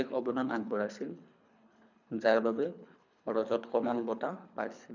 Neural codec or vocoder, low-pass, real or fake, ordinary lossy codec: codec, 24 kHz, 3 kbps, HILCodec; 7.2 kHz; fake; none